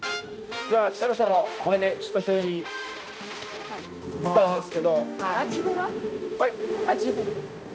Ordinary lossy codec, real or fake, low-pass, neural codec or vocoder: none; fake; none; codec, 16 kHz, 1 kbps, X-Codec, HuBERT features, trained on balanced general audio